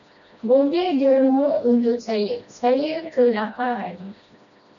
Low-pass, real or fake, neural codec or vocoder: 7.2 kHz; fake; codec, 16 kHz, 1 kbps, FreqCodec, smaller model